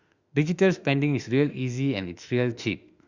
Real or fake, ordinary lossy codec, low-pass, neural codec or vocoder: fake; Opus, 64 kbps; 7.2 kHz; autoencoder, 48 kHz, 32 numbers a frame, DAC-VAE, trained on Japanese speech